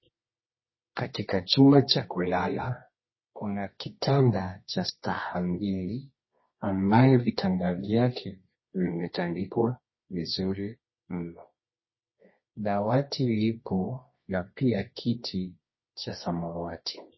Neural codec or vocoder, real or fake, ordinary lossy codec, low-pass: codec, 24 kHz, 0.9 kbps, WavTokenizer, medium music audio release; fake; MP3, 24 kbps; 7.2 kHz